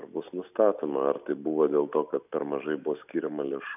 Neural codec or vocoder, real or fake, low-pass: none; real; 3.6 kHz